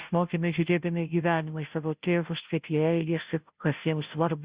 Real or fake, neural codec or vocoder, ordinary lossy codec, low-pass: fake; codec, 16 kHz, 0.5 kbps, FunCodec, trained on Chinese and English, 25 frames a second; Opus, 64 kbps; 3.6 kHz